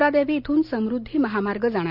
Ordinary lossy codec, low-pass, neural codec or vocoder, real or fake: none; 5.4 kHz; none; real